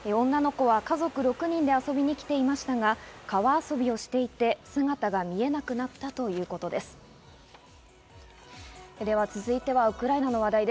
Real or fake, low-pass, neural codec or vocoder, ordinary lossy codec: real; none; none; none